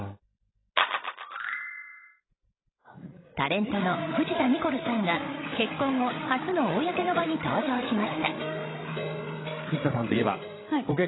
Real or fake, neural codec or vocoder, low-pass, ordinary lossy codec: fake; codec, 16 kHz, 16 kbps, FreqCodec, larger model; 7.2 kHz; AAC, 16 kbps